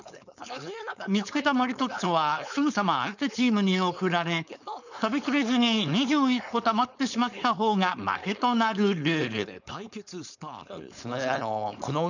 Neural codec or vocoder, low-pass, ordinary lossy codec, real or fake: codec, 16 kHz, 4.8 kbps, FACodec; 7.2 kHz; none; fake